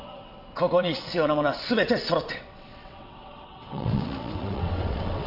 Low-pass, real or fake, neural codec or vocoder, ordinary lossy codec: 5.4 kHz; fake; vocoder, 22.05 kHz, 80 mel bands, WaveNeXt; none